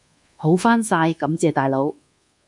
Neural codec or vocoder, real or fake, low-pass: codec, 24 kHz, 1.2 kbps, DualCodec; fake; 10.8 kHz